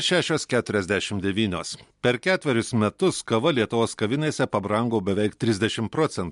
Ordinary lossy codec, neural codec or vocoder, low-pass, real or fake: MP3, 64 kbps; none; 10.8 kHz; real